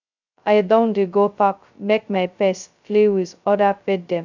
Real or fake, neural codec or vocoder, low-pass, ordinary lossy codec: fake; codec, 16 kHz, 0.2 kbps, FocalCodec; 7.2 kHz; none